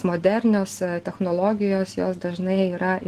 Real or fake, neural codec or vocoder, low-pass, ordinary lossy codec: real; none; 14.4 kHz; Opus, 16 kbps